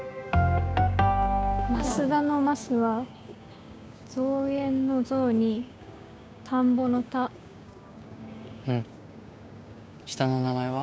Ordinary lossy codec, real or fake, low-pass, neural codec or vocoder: none; fake; none; codec, 16 kHz, 6 kbps, DAC